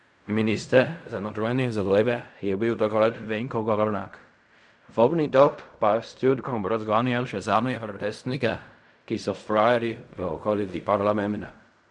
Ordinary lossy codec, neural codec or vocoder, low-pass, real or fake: none; codec, 16 kHz in and 24 kHz out, 0.4 kbps, LongCat-Audio-Codec, fine tuned four codebook decoder; 10.8 kHz; fake